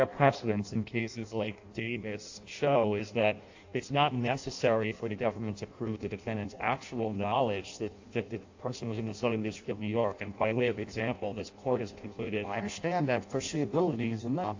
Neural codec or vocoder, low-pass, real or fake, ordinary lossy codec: codec, 16 kHz in and 24 kHz out, 0.6 kbps, FireRedTTS-2 codec; 7.2 kHz; fake; AAC, 48 kbps